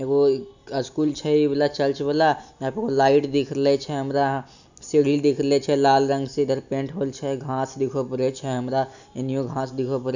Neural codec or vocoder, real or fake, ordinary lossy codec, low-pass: none; real; none; 7.2 kHz